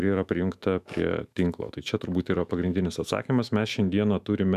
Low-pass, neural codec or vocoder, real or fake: 14.4 kHz; autoencoder, 48 kHz, 128 numbers a frame, DAC-VAE, trained on Japanese speech; fake